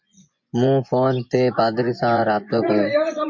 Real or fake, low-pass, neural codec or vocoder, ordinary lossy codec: fake; 7.2 kHz; vocoder, 24 kHz, 100 mel bands, Vocos; MP3, 48 kbps